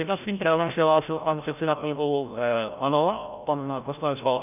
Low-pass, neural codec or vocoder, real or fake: 3.6 kHz; codec, 16 kHz, 0.5 kbps, FreqCodec, larger model; fake